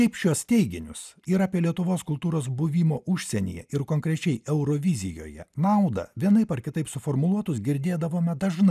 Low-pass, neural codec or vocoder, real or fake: 14.4 kHz; none; real